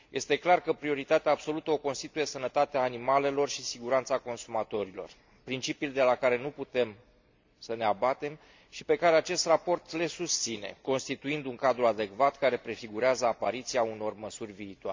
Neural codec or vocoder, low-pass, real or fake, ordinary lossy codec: none; 7.2 kHz; real; MP3, 64 kbps